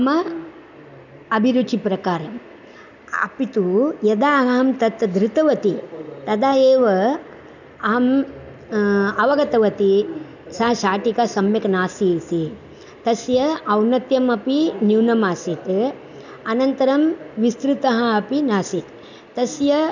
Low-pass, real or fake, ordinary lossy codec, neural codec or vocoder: 7.2 kHz; real; none; none